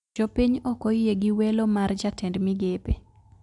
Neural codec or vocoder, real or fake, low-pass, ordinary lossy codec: none; real; 10.8 kHz; none